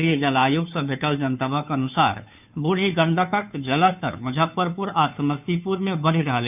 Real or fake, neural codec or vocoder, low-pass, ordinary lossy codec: fake; codec, 16 kHz, 2 kbps, FunCodec, trained on Chinese and English, 25 frames a second; 3.6 kHz; none